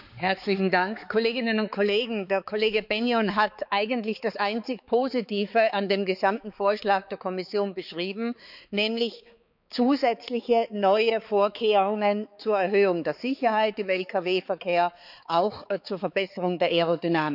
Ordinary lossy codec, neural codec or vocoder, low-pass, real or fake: none; codec, 16 kHz, 4 kbps, X-Codec, HuBERT features, trained on balanced general audio; 5.4 kHz; fake